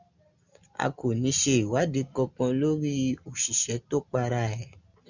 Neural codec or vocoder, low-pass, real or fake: none; 7.2 kHz; real